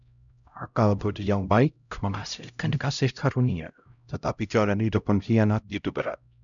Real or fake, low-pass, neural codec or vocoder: fake; 7.2 kHz; codec, 16 kHz, 0.5 kbps, X-Codec, HuBERT features, trained on LibriSpeech